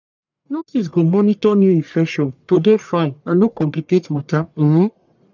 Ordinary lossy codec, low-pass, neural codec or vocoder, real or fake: none; 7.2 kHz; codec, 44.1 kHz, 1.7 kbps, Pupu-Codec; fake